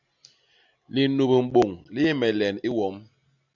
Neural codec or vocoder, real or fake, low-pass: none; real; 7.2 kHz